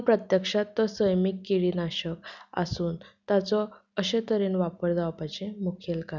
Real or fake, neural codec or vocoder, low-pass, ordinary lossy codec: real; none; 7.2 kHz; none